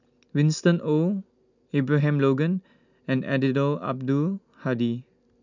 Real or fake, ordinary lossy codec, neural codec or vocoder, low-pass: real; none; none; 7.2 kHz